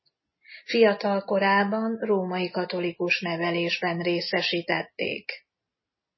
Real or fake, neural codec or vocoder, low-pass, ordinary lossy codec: real; none; 7.2 kHz; MP3, 24 kbps